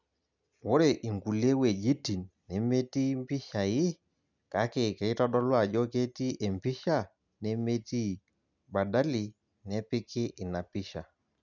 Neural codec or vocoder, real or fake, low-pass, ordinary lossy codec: none; real; 7.2 kHz; none